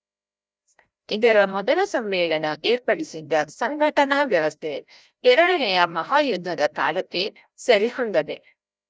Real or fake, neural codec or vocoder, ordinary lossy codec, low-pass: fake; codec, 16 kHz, 0.5 kbps, FreqCodec, larger model; none; none